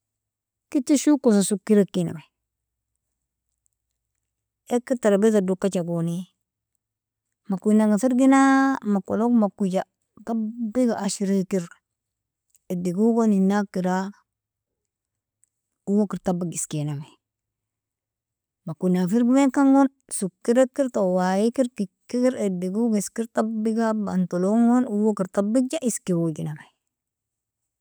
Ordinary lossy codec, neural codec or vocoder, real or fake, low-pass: none; none; real; none